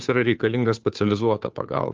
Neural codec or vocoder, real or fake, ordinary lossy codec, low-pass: codec, 16 kHz, 2 kbps, FunCodec, trained on Chinese and English, 25 frames a second; fake; Opus, 24 kbps; 7.2 kHz